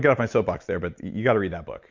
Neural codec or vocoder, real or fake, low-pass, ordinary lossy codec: none; real; 7.2 kHz; MP3, 64 kbps